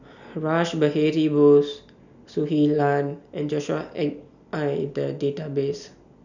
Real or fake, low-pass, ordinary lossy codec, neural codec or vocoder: real; 7.2 kHz; none; none